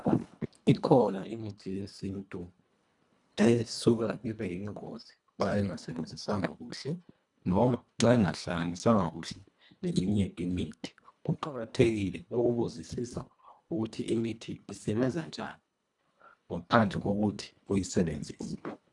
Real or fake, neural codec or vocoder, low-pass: fake; codec, 24 kHz, 1.5 kbps, HILCodec; 10.8 kHz